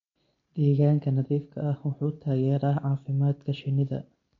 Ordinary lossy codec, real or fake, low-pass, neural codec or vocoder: MP3, 48 kbps; real; 7.2 kHz; none